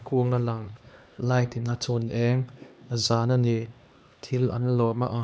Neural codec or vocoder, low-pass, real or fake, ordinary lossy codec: codec, 16 kHz, 2 kbps, X-Codec, HuBERT features, trained on LibriSpeech; none; fake; none